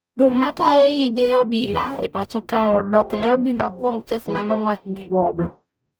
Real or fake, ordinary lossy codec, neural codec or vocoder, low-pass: fake; none; codec, 44.1 kHz, 0.9 kbps, DAC; none